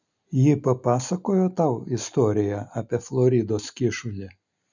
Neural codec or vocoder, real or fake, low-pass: none; real; 7.2 kHz